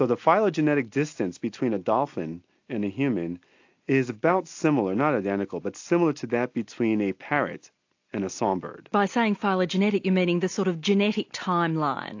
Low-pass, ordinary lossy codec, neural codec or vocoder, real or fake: 7.2 kHz; AAC, 48 kbps; none; real